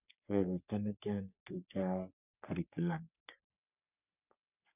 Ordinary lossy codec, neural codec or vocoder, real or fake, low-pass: none; codec, 44.1 kHz, 3.4 kbps, Pupu-Codec; fake; 3.6 kHz